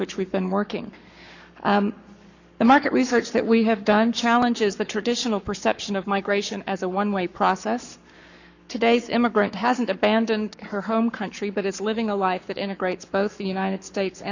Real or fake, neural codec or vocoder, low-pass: fake; codec, 44.1 kHz, 7.8 kbps, Pupu-Codec; 7.2 kHz